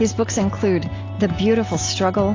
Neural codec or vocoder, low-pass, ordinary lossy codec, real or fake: none; 7.2 kHz; AAC, 32 kbps; real